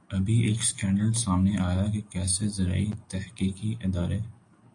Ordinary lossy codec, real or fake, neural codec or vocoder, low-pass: AAC, 48 kbps; real; none; 9.9 kHz